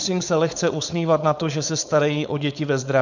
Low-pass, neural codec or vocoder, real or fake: 7.2 kHz; codec, 16 kHz, 4.8 kbps, FACodec; fake